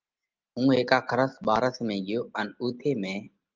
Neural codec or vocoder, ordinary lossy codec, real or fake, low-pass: none; Opus, 32 kbps; real; 7.2 kHz